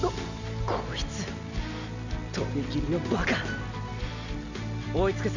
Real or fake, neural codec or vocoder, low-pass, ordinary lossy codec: real; none; 7.2 kHz; none